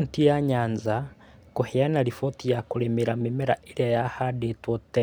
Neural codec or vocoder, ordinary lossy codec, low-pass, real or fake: none; none; none; real